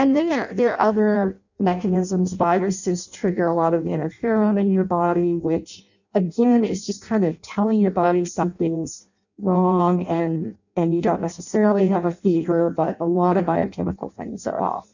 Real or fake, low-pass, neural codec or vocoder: fake; 7.2 kHz; codec, 16 kHz in and 24 kHz out, 0.6 kbps, FireRedTTS-2 codec